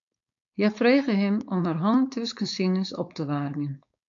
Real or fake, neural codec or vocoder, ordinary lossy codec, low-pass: fake; codec, 16 kHz, 4.8 kbps, FACodec; AAC, 64 kbps; 7.2 kHz